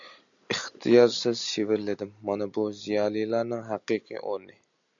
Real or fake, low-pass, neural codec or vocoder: real; 7.2 kHz; none